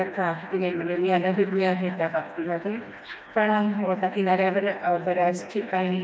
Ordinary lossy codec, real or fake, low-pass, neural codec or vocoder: none; fake; none; codec, 16 kHz, 1 kbps, FreqCodec, smaller model